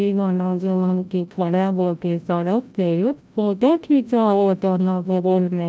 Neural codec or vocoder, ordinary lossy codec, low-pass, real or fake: codec, 16 kHz, 0.5 kbps, FreqCodec, larger model; none; none; fake